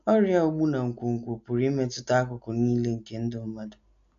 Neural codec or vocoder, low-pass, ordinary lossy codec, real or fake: none; 7.2 kHz; MP3, 64 kbps; real